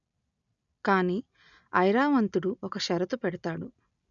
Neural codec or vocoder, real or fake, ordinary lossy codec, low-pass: none; real; none; 7.2 kHz